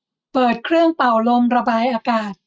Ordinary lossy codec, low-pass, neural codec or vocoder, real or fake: none; none; none; real